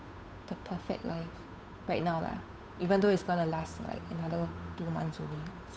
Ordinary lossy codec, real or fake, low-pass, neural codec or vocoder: none; fake; none; codec, 16 kHz, 8 kbps, FunCodec, trained on Chinese and English, 25 frames a second